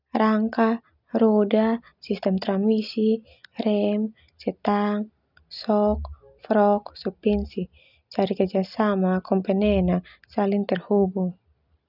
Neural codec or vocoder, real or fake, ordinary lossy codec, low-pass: none; real; none; 5.4 kHz